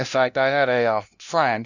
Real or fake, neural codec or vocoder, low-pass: fake; codec, 16 kHz, 0.5 kbps, FunCodec, trained on LibriTTS, 25 frames a second; 7.2 kHz